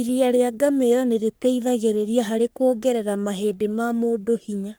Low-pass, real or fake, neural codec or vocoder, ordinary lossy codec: none; fake; codec, 44.1 kHz, 3.4 kbps, Pupu-Codec; none